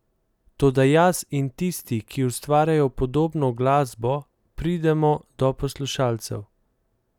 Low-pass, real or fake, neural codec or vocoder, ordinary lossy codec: 19.8 kHz; real; none; none